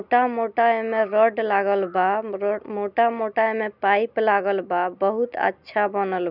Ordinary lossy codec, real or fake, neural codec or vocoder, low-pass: none; real; none; 5.4 kHz